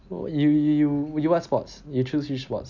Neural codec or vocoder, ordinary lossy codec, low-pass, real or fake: none; none; 7.2 kHz; real